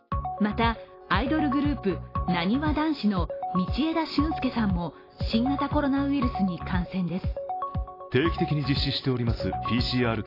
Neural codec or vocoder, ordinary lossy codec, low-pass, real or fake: none; AAC, 24 kbps; 5.4 kHz; real